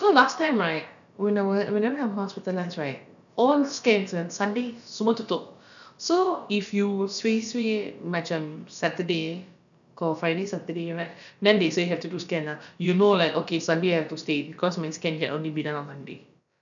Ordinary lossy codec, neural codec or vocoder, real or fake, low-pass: none; codec, 16 kHz, about 1 kbps, DyCAST, with the encoder's durations; fake; 7.2 kHz